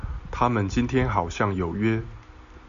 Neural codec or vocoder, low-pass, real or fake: none; 7.2 kHz; real